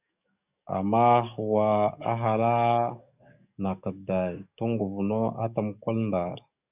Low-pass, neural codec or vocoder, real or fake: 3.6 kHz; codec, 44.1 kHz, 7.8 kbps, DAC; fake